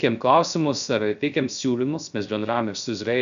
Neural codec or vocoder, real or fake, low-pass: codec, 16 kHz, 0.3 kbps, FocalCodec; fake; 7.2 kHz